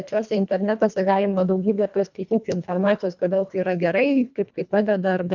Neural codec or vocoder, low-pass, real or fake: codec, 24 kHz, 1.5 kbps, HILCodec; 7.2 kHz; fake